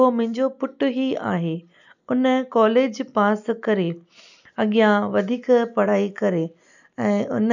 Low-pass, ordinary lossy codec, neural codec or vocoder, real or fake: 7.2 kHz; none; none; real